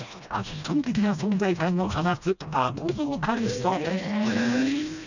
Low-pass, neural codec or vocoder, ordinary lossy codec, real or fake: 7.2 kHz; codec, 16 kHz, 1 kbps, FreqCodec, smaller model; none; fake